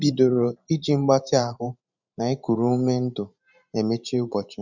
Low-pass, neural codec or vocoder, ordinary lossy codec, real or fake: 7.2 kHz; vocoder, 44.1 kHz, 128 mel bands every 512 samples, BigVGAN v2; none; fake